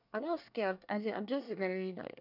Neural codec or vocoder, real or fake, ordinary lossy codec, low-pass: codec, 24 kHz, 1 kbps, SNAC; fake; none; 5.4 kHz